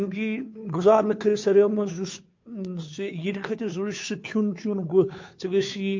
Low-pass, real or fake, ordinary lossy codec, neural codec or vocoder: 7.2 kHz; fake; MP3, 48 kbps; codec, 16 kHz, 2 kbps, FunCodec, trained on Chinese and English, 25 frames a second